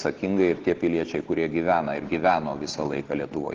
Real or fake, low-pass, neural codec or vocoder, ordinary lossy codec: real; 7.2 kHz; none; Opus, 16 kbps